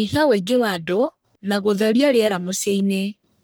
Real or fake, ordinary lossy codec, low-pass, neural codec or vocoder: fake; none; none; codec, 44.1 kHz, 1.7 kbps, Pupu-Codec